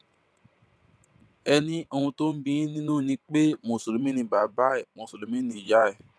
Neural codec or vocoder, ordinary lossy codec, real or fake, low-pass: vocoder, 22.05 kHz, 80 mel bands, WaveNeXt; none; fake; none